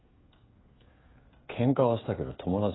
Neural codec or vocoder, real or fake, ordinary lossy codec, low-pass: codec, 16 kHz, 16 kbps, FreqCodec, smaller model; fake; AAC, 16 kbps; 7.2 kHz